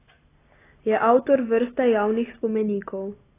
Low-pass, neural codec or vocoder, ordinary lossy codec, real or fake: 3.6 kHz; none; AAC, 16 kbps; real